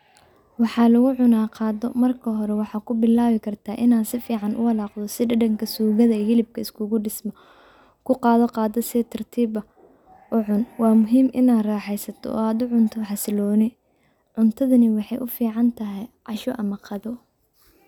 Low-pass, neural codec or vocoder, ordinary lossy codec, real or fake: 19.8 kHz; none; none; real